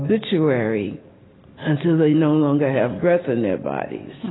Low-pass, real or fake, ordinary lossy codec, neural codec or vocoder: 7.2 kHz; real; AAC, 16 kbps; none